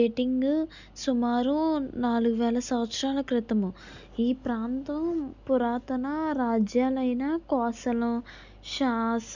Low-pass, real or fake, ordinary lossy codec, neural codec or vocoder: 7.2 kHz; real; none; none